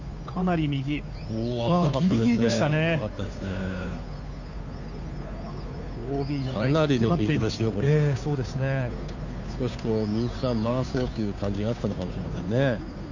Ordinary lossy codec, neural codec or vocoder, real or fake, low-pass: none; codec, 16 kHz, 2 kbps, FunCodec, trained on Chinese and English, 25 frames a second; fake; 7.2 kHz